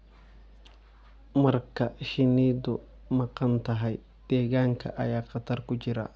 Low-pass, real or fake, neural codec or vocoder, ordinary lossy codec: none; real; none; none